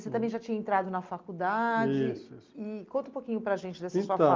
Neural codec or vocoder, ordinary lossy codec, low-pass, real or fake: none; Opus, 32 kbps; 7.2 kHz; real